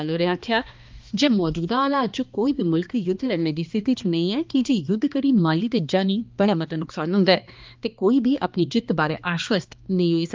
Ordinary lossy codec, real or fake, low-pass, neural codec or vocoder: Opus, 24 kbps; fake; 7.2 kHz; codec, 16 kHz, 2 kbps, X-Codec, HuBERT features, trained on balanced general audio